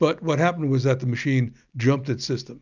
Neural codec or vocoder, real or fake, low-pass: none; real; 7.2 kHz